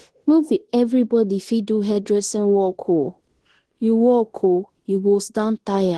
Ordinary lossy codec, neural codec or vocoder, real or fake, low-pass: Opus, 16 kbps; codec, 16 kHz in and 24 kHz out, 0.9 kbps, LongCat-Audio-Codec, fine tuned four codebook decoder; fake; 10.8 kHz